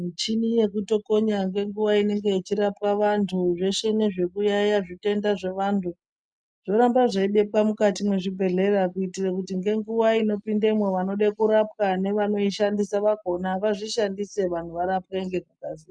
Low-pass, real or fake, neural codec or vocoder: 9.9 kHz; real; none